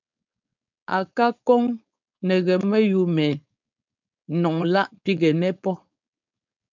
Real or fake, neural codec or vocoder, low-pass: fake; codec, 16 kHz, 4.8 kbps, FACodec; 7.2 kHz